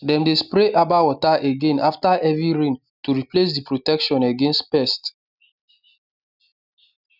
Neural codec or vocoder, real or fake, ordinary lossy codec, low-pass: none; real; none; 5.4 kHz